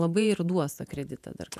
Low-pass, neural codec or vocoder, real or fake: 14.4 kHz; vocoder, 48 kHz, 128 mel bands, Vocos; fake